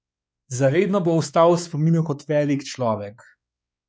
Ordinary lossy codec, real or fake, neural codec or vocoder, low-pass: none; fake; codec, 16 kHz, 4 kbps, X-Codec, WavLM features, trained on Multilingual LibriSpeech; none